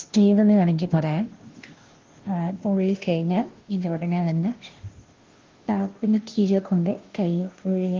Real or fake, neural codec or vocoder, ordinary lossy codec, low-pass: fake; codec, 16 kHz, 0.5 kbps, FunCodec, trained on Chinese and English, 25 frames a second; Opus, 16 kbps; 7.2 kHz